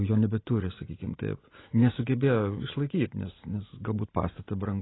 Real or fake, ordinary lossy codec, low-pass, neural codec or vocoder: real; AAC, 16 kbps; 7.2 kHz; none